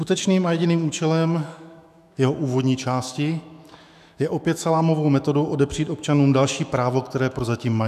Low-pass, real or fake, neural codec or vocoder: 14.4 kHz; fake; autoencoder, 48 kHz, 128 numbers a frame, DAC-VAE, trained on Japanese speech